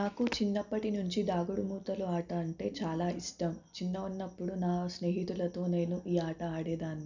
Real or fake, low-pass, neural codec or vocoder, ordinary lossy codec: real; 7.2 kHz; none; none